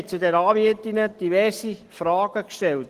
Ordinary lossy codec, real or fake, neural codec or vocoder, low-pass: Opus, 16 kbps; real; none; 14.4 kHz